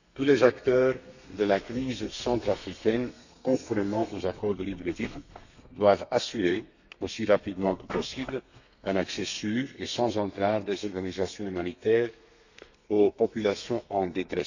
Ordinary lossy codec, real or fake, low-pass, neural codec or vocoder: none; fake; 7.2 kHz; codec, 32 kHz, 1.9 kbps, SNAC